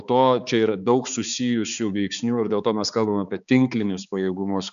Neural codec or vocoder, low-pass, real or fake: codec, 16 kHz, 4 kbps, X-Codec, HuBERT features, trained on balanced general audio; 7.2 kHz; fake